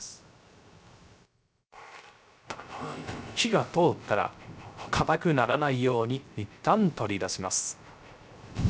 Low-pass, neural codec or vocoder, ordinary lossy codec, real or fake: none; codec, 16 kHz, 0.3 kbps, FocalCodec; none; fake